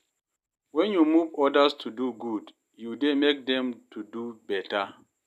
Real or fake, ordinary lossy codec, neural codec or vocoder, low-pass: real; none; none; 14.4 kHz